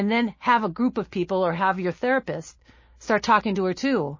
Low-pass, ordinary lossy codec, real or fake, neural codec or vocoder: 7.2 kHz; MP3, 32 kbps; real; none